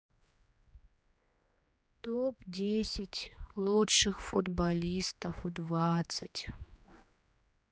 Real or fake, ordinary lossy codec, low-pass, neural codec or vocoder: fake; none; none; codec, 16 kHz, 2 kbps, X-Codec, HuBERT features, trained on general audio